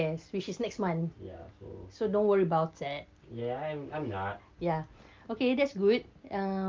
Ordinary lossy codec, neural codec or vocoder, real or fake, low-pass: Opus, 24 kbps; none; real; 7.2 kHz